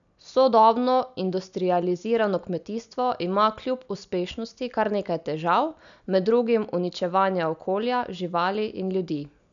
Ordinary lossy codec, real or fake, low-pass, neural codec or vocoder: none; real; 7.2 kHz; none